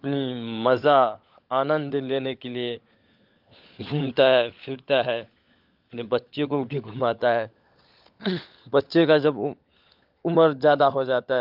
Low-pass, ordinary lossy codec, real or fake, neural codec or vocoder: 5.4 kHz; Opus, 24 kbps; fake; codec, 16 kHz, 16 kbps, FunCodec, trained on LibriTTS, 50 frames a second